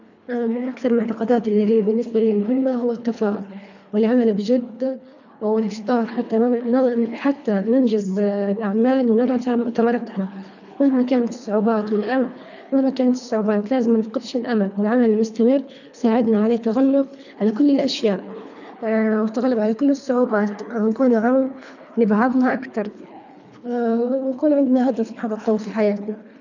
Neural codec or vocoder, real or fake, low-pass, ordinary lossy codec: codec, 24 kHz, 3 kbps, HILCodec; fake; 7.2 kHz; none